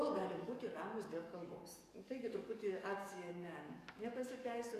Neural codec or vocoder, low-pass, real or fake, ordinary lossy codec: codec, 44.1 kHz, 7.8 kbps, DAC; 14.4 kHz; fake; Opus, 64 kbps